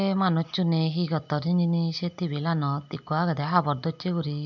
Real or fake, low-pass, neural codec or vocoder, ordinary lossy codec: real; 7.2 kHz; none; none